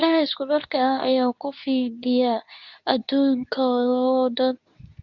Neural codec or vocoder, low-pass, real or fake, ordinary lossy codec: codec, 24 kHz, 0.9 kbps, WavTokenizer, medium speech release version 2; 7.2 kHz; fake; none